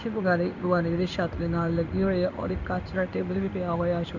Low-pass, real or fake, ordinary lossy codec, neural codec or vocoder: 7.2 kHz; fake; none; codec, 16 kHz in and 24 kHz out, 1 kbps, XY-Tokenizer